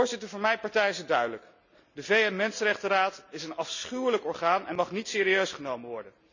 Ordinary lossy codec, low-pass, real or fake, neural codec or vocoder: none; 7.2 kHz; real; none